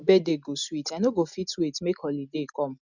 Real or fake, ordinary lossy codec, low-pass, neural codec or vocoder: real; none; 7.2 kHz; none